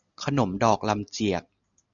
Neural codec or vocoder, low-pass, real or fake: none; 7.2 kHz; real